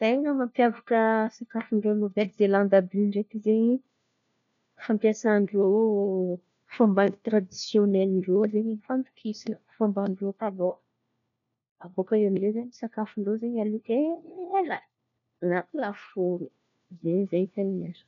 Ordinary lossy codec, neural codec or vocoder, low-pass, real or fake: none; codec, 16 kHz, 1 kbps, FunCodec, trained on LibriTTS, 50 frames a second; 7.2 kHz; fake